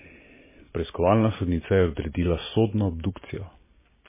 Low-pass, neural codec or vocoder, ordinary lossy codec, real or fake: 3.6 kHz; none; MP3, 16 kbps; real